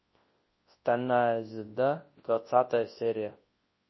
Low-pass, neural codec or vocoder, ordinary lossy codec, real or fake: 7.2 kHz; codec, 24 kHz, 0.9 kbps, WavTokenizer, large speech release; MP3, 24 kbps; fake